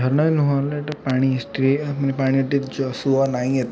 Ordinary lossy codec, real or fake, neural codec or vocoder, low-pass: none; real; none; none